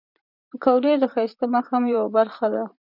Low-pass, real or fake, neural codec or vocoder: 5.4 kHz; fake; vocoder, 44.1 kHz, 128 mel bands, Pupu-Vocoder